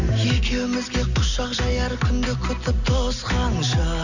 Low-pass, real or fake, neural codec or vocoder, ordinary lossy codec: 7.2 kHz; real; none; none